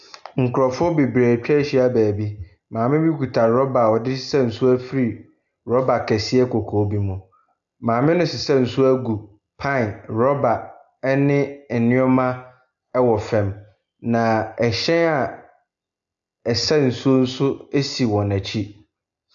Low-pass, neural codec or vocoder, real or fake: 7.2 kHz; none; real